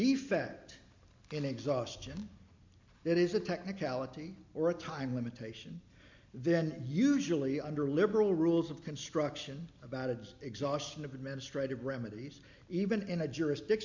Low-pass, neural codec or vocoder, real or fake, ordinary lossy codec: 7.2 kHz; none; real; MP3, 64 kbps